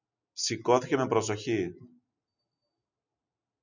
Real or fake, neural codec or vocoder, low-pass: real; none; 7.2 kHz